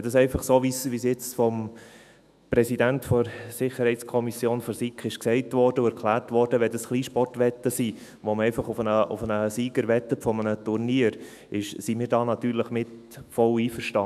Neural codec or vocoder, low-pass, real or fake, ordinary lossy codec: autoencoder, 48 kHz, 128 numbers a frame, DAC-VAE, trained on Japanese speech; 14.4 kHz; fake; none